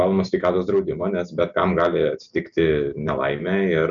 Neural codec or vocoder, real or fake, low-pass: none; real; 7.2 kHz